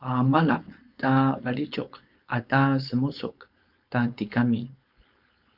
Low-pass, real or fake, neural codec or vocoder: 5.4 kHz; fake; codec, 16 kHz, 4.8 kbps, FACodec